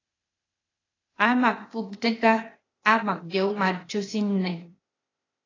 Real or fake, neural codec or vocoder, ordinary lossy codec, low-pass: fake; codec, 16 kHz, 0.8 kbps, ZipCodec; AAC, 32 kbps; 7.2 kHz